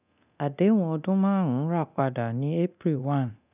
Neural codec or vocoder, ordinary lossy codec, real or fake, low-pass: codec, 24 kHz, 0.9 kbps, DualCodec; none; fake; 3.6 kHz